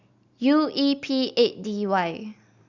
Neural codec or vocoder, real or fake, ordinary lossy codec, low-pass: none; real; Opus, 64 kbps; 7.2 kHz